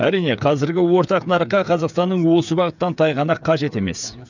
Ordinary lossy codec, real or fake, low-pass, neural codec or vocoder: none; fake; 7.2 kHz; codec, 16 kHz, 8 kbps, FreqCodec, smaller model